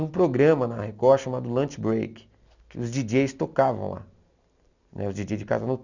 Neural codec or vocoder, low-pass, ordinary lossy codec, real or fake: none; 7.2 kHz; none; real